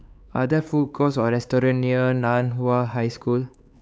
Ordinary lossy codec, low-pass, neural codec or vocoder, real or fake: none; none; codec, 16 kHz, 4 kbps, X-Codec, HuBERT features, trained on LibriSpeech; fake